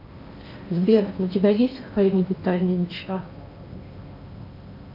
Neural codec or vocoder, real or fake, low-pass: codec, 16 kHz in and 24 kHz out, 0.8 kbps, FocalCodec, streaming, 65536 codes; fake; 5.4 kHz